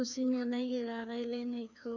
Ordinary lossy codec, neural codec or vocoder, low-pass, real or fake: none; codec, 16 kHz, 2 kbps, FreqCodec, larger model; 7.2 kHz; fake